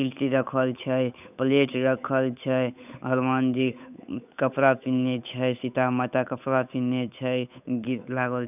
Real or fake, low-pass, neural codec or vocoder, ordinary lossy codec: fake; 3.6 kHz; codec, 16 kHz, 8 kbps, FunCodec, trained on Chinese and English, 25 frames a second; none